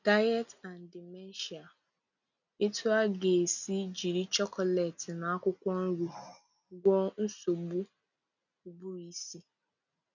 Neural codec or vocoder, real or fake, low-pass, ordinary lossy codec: none; real; 7.2 kHz; none